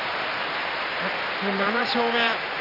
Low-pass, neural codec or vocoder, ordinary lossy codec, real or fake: 5.4 kHz; vocoder, 44.1 kHz, 128 mel bands every 512 samples, BigVGAN v2; none; fake